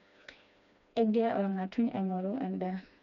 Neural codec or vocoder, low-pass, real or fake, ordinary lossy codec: codec, 16 kHz, 2 kbps, FreqCodec, smaller model; 7.2 kHz; fake; none